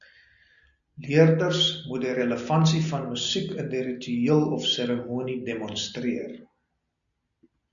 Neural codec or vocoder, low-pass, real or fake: none; 7.2 kHz; real